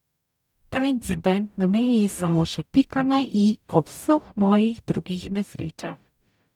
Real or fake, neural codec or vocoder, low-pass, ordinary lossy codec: fake; codec, 44.1 kHz, 0.9 kbps, DAC; 19.8 kHz; none